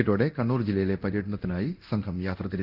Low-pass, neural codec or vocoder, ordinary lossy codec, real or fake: 5.4 kHz; codec, 24 kHz, 0.9 kbps, DualCodec; Opus, 32 kbps; fake